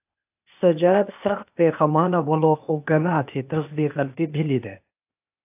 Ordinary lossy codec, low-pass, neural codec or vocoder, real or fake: AAC, 32 kbps; 3.6 kHz; codec, 16 kHz, 0.8 kbps, ZipCodec; fake